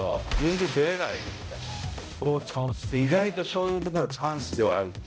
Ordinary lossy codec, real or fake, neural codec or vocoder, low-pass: none; fake; codec, 16 kHz, 0.5 kbps, X-Codec, HuBERT features, trained on balanced general audio; none